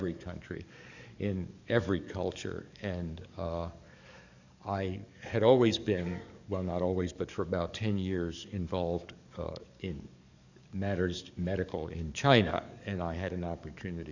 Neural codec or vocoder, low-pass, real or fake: codec, 44.1 kHz, 7.8 kbps, DAC; 7.2 kHz; fake